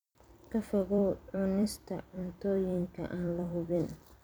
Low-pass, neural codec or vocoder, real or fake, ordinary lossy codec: none; vocoder, 44.1 kHz, 128 mel bands every 256 samples, BigVGAN v2; fake; none